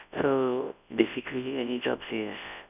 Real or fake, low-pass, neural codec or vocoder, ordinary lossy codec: fake; 3.6 kHz; codec, 24 kHz, 0.9 kbps, WavTokenizer, large speech release; none